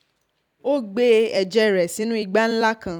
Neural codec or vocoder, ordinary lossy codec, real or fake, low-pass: vocoder, 44.1 kHz, 128 mel bands every 256 samples, BigVGAN v2; none; fake; 19.8 kHz